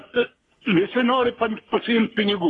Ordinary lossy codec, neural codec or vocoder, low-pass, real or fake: AAC, 32 kbps; codec, 44.1 kHz, 3.4 kbps, Pupu-Codec; 10.8 kHz; fake